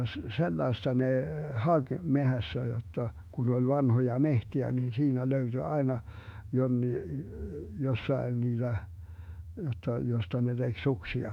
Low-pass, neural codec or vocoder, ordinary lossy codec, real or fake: 19.8 kHz; autoencoder, 48 kHz, 32 numbers a frame, DAC-VAE, trained on Japanese speech; none; fake